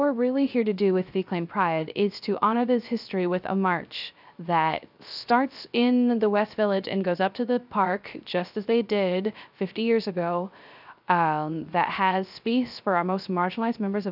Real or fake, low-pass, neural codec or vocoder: fake; 5.4 kHz; codec, 16 kHz, 0.3 kbps, FocalCodec